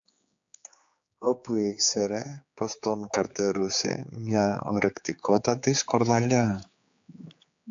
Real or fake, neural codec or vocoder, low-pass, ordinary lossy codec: fake; codec, 16 kHz, 4 kbps, X-Codec, HuBERT features, trained on general audio; 7.2 kHz; AAC, 64 kbps